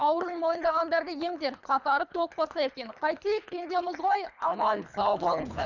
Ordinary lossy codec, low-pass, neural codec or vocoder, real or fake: none; 7.2 kHz; codec, 24 kHz, 3 kbps, HILCodec; fake